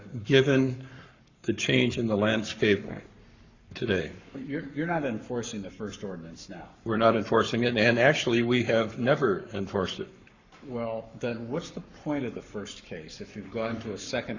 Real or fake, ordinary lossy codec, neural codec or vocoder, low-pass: fake; Opus, 64 kbps; codec, 44.1 kHz, 7.8 kbps, Pupu-Codec; 7.2 kHz